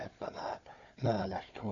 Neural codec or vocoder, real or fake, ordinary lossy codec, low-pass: codec, 16 kHz, 4 kbps, FunCodec, trained on Chinese and English, 50 frames a second; fake; none; 7.2 kHz